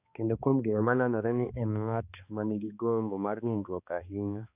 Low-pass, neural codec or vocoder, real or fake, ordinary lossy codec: 3.6 kHz; codec, 16 kHz, 2 kbps, X-Codec, HuBERT features, trained on balanced general audio; fake; none